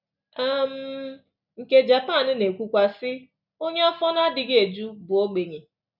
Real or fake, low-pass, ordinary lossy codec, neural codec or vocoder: real; 5.4 kHz; none; none